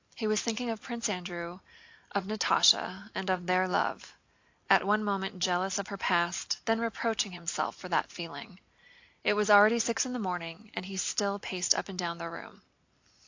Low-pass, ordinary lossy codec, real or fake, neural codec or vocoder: 7.2 kHz; AAC, 48 kbps; real; none